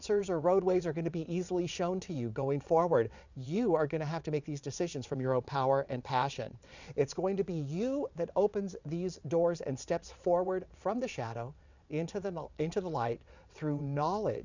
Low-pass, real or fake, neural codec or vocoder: 7.2 kHz; fake; vocoder, 22.05 kHz, 80 mel bands, WaveNeXt